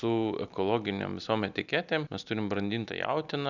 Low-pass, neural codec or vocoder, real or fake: 7.2 kHz; none; real